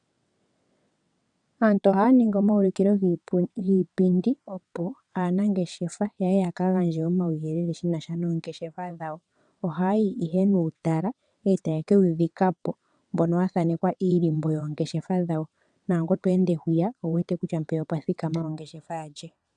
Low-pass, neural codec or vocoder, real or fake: 9.9 kHz; vocoder, 22.05 kHz, 80 mel bands, WaveNeXt; fake